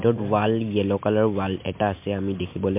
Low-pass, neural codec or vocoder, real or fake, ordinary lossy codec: 3.6 kHz; none; real; MP3, 32 kbps